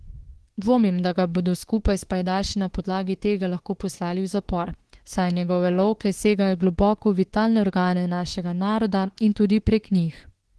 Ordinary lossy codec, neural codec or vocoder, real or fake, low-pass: Opus, 16 kbps; autoencoder, 48 kHz, 32 numbers a frame, DAC-VAE, trained on Japanese speech; fake; 10.8 kHz